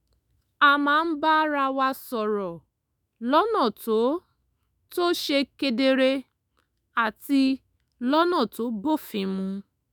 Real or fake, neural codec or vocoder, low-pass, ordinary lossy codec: fake; autoencoder, 48 kHz, 128 numbers a frame, DAC-VAE, trained on Japanese speech; none; none